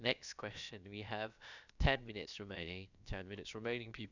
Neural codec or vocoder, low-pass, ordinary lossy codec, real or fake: codec, 16 kHz, about 1 kbps, DyCAST, with the encoder's durations; 7.2 kHz; none; fake